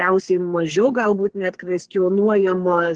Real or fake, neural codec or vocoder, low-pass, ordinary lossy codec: fake; codec, 32 kHz, 1.9 kbps, SNAC; 9.9 kHz; Opus, 16 kbps